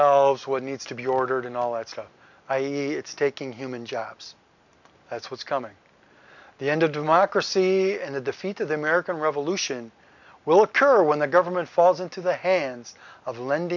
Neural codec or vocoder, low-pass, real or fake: none; 7.2 kHz; real